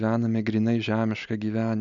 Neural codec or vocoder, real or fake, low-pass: none; real; 7.2 kHz